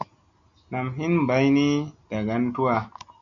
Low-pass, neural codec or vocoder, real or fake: 7.2 kHz; none; real